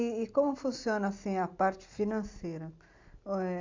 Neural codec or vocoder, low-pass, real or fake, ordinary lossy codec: none; 7.2 kHz; real; MP3, 64 kbps